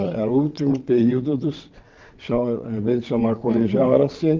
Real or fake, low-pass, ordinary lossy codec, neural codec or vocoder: fake; 7.2 kHz; Opus, 32 kbps; vocoder, 22.05 kHz, 80 mel bands, WaveNeXt